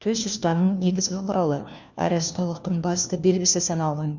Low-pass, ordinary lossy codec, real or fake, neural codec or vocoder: 7.2 kHz; none; fake; codec, 16 kHz, 1 kbps, FunCodec, trained on LibriTTS, 50 frames a second